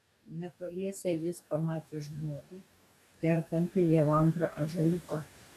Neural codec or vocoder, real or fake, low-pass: codec, 44.1 kHz, 2.6 kbps, DAC; fake; 14.4 kHz